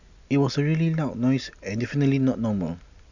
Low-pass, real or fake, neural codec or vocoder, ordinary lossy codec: 7.2 kHz; real; none; none